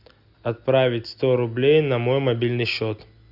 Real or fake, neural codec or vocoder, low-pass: real; none; 5.4 kHz